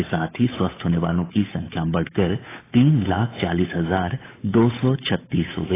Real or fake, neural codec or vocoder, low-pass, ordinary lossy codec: fake; codec, 16 kHz, 8 kbps, FunCodec, trained on Chinese and English, 25 frames a second; 3.6 kHz; AAC, 16 kbps